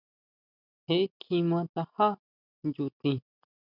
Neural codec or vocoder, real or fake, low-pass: none; real; 5.4 kHz